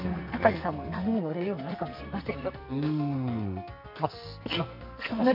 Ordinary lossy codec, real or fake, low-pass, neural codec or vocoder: none; fake; 5.4 kHz; codec, 44.1 kHz, 2.6 kbps, SNAC